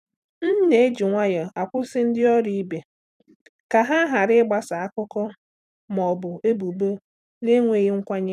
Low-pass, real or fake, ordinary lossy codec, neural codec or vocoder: 14.4 kHz; fake; none; vocoder, 44.1 kHz, 128 mel bands every 256 samples, BigVGAN v2